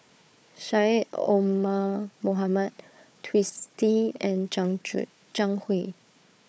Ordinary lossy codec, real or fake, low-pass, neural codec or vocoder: none; fake; none; codec, 16 kHz, 4 kbps, FunCodec, trained on Chinese and English, 50 frames a second